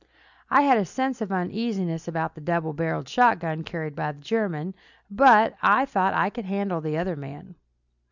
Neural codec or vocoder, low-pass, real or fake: none; 7.2 kHz; real